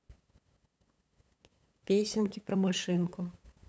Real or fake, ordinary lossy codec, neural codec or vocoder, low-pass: fake; none; codec, 16 kHz, 8 kbps, FunCodec, trained on LibriTTS, 25 frames a second; none